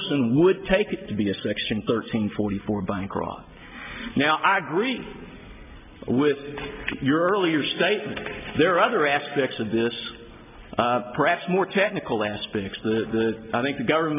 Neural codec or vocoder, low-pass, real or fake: none; 3.6 kHz; real